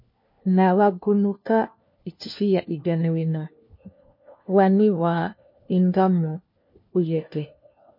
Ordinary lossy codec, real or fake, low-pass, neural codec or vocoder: MP3, 32 kbps; fake; 5.4 kHz; codec, 16 kHz, 1 kbps, FunCodec, trained on LibriTTS, 50 frames a second